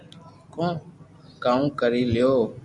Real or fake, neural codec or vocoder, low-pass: real; none; 10.8 kHz